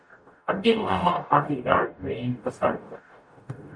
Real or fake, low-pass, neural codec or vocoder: fake; 9.9 kHz; codec, 44.1 kHz, 0.9 kbps, DAC